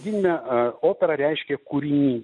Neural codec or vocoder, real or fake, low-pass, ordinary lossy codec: none; real; 10.8 kHz; MP3, 48 kbps